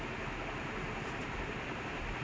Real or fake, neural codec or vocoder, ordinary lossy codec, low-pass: real; none; none; none